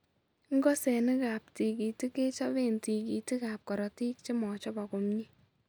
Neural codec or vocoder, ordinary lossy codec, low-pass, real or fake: none; none; none; real